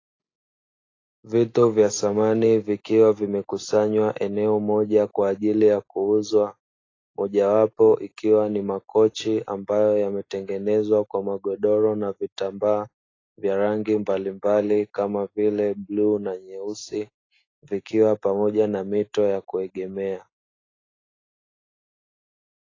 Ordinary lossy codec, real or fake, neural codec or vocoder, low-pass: AAC, 32 kbps; real; none; 7.2 kHz